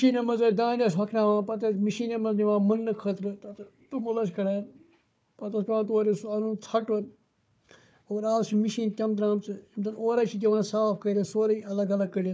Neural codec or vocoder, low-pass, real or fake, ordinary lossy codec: codec, 16 kHz, 4 kbps, FunCodec, trained on Chinese and English, 50 frames a second; none; fake; none